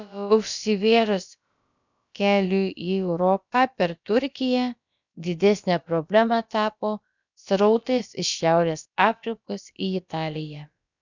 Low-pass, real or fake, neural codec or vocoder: 7.2 kHz; fake; codec, 16 kHz, about 1 kbps, DyCAST, with the encoder's durations